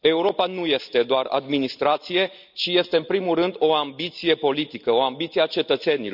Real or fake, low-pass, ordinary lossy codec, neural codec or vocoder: real; 5.4 kHz; none; none